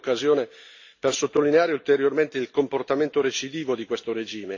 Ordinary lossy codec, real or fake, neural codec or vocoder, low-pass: AAC, 48 kbps; real; none; 7.2 kHz